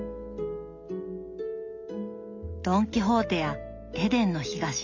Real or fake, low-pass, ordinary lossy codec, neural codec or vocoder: real; 7.2 kHz; none; none